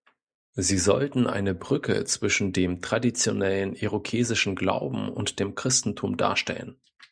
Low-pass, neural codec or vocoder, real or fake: 9.9 kHz; none; real